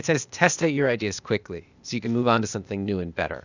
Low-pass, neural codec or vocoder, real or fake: 7.2 kHz; codec, 16 kHz, 0.8 kbps, ZipCodec; fake